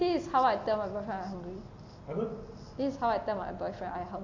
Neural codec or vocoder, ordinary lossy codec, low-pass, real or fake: none; AAC, 48 kbps; 7.2 kHz; real